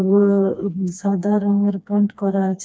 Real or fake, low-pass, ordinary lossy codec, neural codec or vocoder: fake; none; none; codec, 16 kHz, 2 kbps, FreqCodec, smaller model